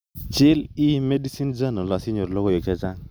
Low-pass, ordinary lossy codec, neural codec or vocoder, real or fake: none; none; none; real